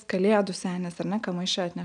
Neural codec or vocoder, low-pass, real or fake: none; 9.9 kHz; real